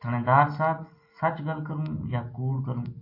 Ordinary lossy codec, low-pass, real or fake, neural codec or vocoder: MP3, 32 kbps; 5.4 kHz; real; none